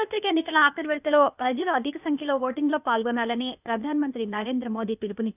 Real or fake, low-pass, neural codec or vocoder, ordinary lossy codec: fake; 3.6 kHz; codec, 16 kHz, 0.8 kbps, ZipCodec; none